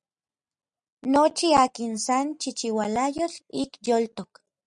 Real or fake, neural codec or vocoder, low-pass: real; none; 9.9 kHz